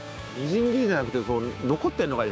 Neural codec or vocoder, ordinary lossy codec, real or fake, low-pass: codec, 16 kHz, 6 kbps, DAC; none; fake; none